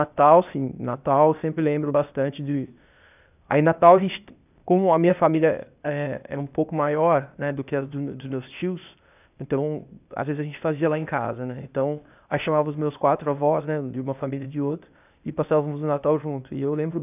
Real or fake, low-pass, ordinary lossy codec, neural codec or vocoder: fake; 3.6 kHz; none; codec, 16 kHz, 0.8 kbps, ZipCodec